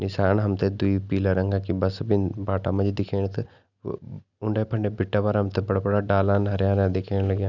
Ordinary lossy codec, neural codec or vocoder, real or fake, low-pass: none; none; real; 7.2 kHz